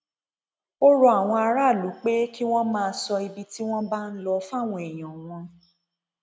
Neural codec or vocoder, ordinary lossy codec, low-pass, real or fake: none; none; none; real